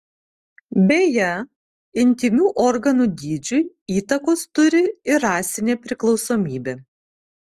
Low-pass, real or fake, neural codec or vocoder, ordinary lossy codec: 14.4 kHz; real; none; Opus, 24 kbps